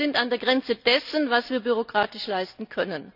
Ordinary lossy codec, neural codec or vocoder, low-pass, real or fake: none; none; 5.4 kHz; real